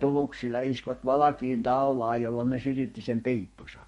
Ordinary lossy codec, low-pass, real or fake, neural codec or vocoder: MP3, 48 kbps; 14.4 kHz; fake; codec, 32 kHz, 1.9 kbps, SNAC